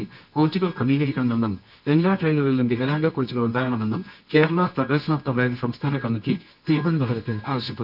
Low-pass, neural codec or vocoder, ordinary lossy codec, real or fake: 5.4 kHz; codec, 24 kHz, 0.9 kbps, WavTokenizer, medium music audio release; none; fake